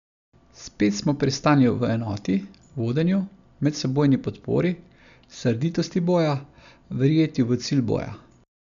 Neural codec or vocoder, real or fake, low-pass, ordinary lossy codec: none; real; 7.2 kHz; none